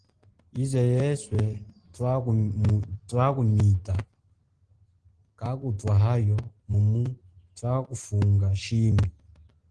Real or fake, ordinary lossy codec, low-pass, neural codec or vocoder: real; Opus, 16 kbps; 9.9 kHz; none